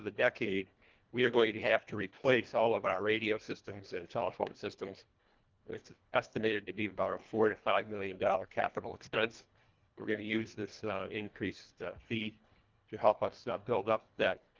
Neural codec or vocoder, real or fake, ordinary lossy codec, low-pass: codec, 24 kHz, 1.5 kbps, HILCodec; fake; Opus, 32 kbps; 7.2 kHz